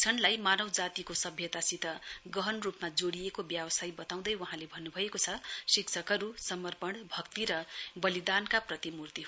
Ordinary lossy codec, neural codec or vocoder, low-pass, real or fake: none; none; none; real